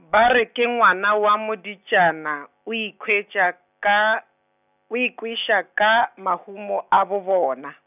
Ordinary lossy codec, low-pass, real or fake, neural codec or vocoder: none; 3.6 kHz; real; none